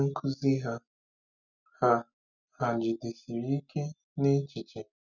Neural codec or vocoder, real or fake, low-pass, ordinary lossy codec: none; real; 7.2 kHz; none